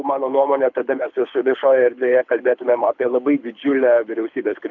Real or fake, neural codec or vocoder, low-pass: fake; codec, 24 kHz, 6 kbps, HILCodec; 7.2 kHz